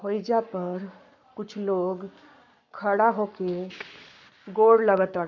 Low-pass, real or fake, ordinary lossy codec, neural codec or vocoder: 7.2 kHz; fake; MP3, 64 kbps; codec, 44.1 kHz, 7.8 kbps, Pupu-Codec